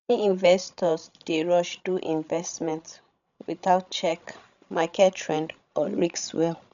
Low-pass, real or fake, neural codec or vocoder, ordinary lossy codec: 7.2 kHz; fake; codec, 16 kHz, 16 kbps, FreqCodec, larger model; MP3, 96 kbps